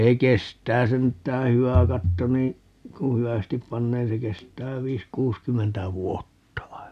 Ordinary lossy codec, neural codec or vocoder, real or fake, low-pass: none; none; real; 14.4 kHz